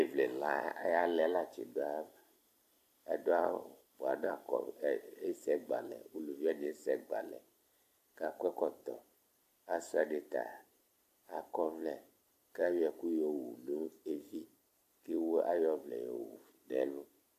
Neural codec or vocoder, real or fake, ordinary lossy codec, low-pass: vocoder, 44.1 kHz, 128 mel bands every 256 samples, BigVGAN v2; fake; AAC, 64 kbps; 14.4 kHz